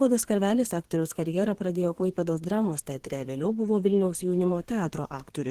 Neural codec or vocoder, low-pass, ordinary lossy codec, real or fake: codec, 32 kHz, 1.9 kbps, SNAC; 14.4 kHz; Opus, 16 kbps; fake